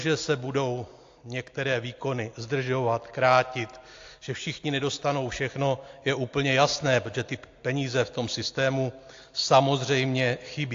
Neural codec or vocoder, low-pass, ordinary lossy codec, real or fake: none; 7.2 kHz; AAC, 48 kbps; real